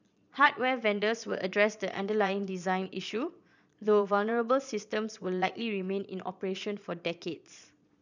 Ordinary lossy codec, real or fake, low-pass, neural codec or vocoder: none; fake; 7.2 kHz; vocoder, 22.05 kHz, 80 mel bands, Vocos